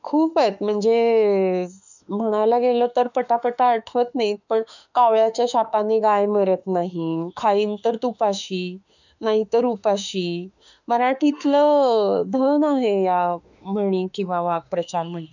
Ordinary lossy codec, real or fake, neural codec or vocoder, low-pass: none; fake; autoencoder, 48 kHz, 32 numbers a frame, DAC-VAE, trained on Japanese speech; 7.2 kHz